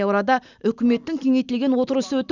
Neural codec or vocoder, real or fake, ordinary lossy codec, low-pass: none; real; none; 7.2 kHz